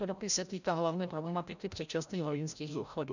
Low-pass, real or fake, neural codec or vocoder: 7.2 kHz; fake; codec, 16 kHz, 0.5 kbps, FreqCodec, larger model